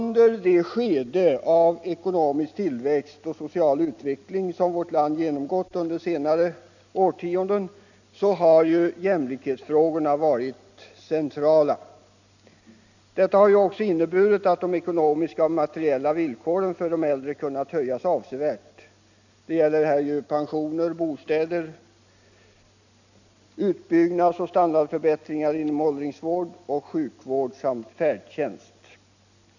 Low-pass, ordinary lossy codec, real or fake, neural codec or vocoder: 7.2 kHz; none; real; none